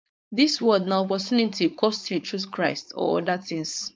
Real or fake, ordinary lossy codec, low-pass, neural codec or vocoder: fake; none; none; codec, 16 kHz, 4.8 kbps, FACodec